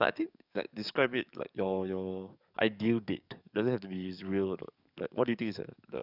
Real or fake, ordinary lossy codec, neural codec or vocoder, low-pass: fake; none; codec, 44.1 kHz, 7.8 kbps, DAC; 5.4 kHz